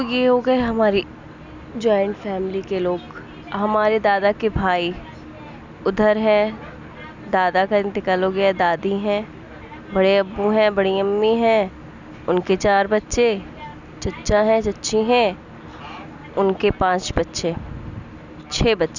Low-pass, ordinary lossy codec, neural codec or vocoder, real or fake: 7.2 kHz; none; none; real